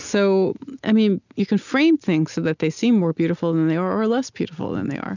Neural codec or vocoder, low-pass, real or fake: none; 7.2 kHz; real